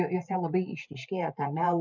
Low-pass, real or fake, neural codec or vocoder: 7.2 kHz; fake; vocoder, 44.1 kHz, 128 mel bands every 512 samples, BigVGAN v2